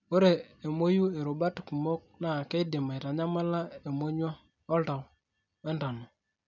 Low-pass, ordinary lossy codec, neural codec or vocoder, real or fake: 7.2 kHz; none; none; real